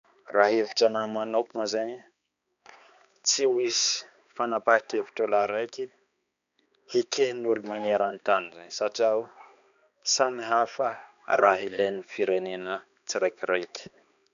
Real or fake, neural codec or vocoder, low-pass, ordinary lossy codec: fake; codec, 16 kHz, 2 kbps, X-Codec, HuBERT features, trained on balanced general audio; 7.2 kHz; none